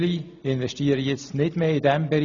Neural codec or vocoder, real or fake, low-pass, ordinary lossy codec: none; real; 7.2 kHz; none